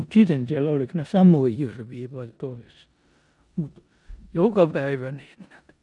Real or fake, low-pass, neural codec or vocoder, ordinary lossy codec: fake; 10.8 kHz; codec, 16 kHz in and 24 kHz out, 0.9 kbps, LongCat-Audio-Codec, four codebook decoder; none